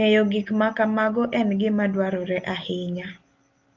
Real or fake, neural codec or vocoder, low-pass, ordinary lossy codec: real; none; 7.2 kHz; Opus, 32 kbps